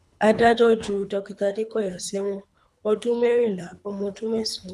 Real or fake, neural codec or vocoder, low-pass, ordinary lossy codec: fake; codec, 24 kHz, 3 kbps, HILCodec; none; none